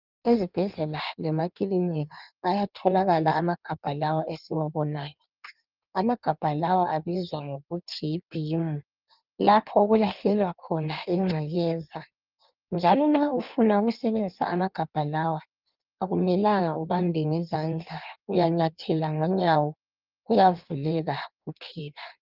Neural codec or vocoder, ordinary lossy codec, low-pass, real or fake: codec, 16 kHz in and 24 kHz out, 1.1 kbps, FireRedTTS-2 codec; Opus, 24 kbps; 5.4 kHz; fake